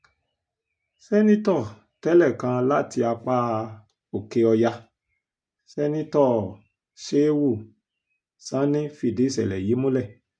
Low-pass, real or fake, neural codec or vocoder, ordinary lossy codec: 9.9 kHz; real; none; MP3, 64 kbps